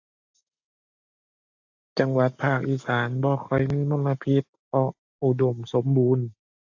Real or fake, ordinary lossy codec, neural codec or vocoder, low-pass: real; AAC, 48 kbps; none; 7.2 kHz